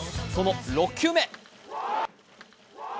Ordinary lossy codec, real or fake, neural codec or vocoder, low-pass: none; real; none; none